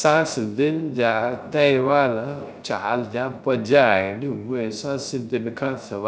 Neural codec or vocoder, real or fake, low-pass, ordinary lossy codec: codec, 16 kHz, 0.3 kbps, FocalCodec; fake; none; none